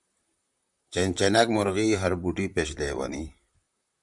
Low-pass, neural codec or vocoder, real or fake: 10.8 kHz; vocoder, 44.1 kHz, 128 mel bands, Pupu-Vocoder; fake